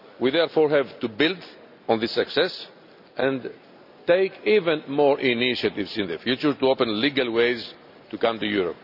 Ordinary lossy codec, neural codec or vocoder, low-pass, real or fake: none; none; 5.4 kHz; real